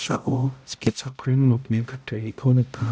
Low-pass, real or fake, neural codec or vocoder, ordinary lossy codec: none; fake; codec, 16 kHz, 0.5 kbps, X-Codec, HuBERT features, trained on balanced general audio; none